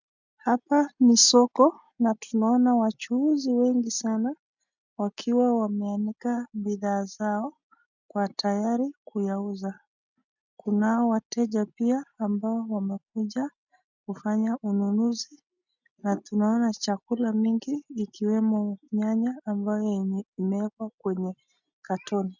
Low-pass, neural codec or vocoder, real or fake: 7.2 kHz; none; real